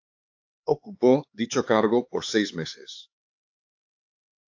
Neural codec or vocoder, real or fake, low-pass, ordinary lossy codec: codec, 16 kHz, 4 kbps, X-Codec, HuBERT features, trained on LibriSpeech; fake; 7.2 kHz; AAC, 48 kbps